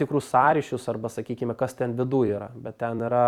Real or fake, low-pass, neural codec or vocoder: fake; 19.8 kHz; vocoder, 44.1 kHz, 128 mel bands every 256 samples, BigVGAN v2